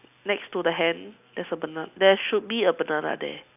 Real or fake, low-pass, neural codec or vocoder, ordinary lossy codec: real; 3.6 kHz; none; none